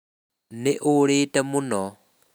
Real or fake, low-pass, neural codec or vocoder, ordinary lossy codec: real; none; none; none